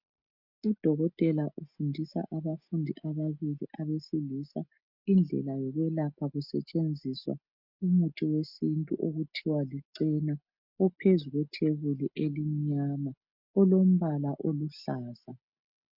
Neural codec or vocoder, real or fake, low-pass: none; real; 5.4 kHz